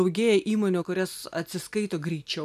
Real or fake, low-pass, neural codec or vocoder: real; 14.4 kHz; none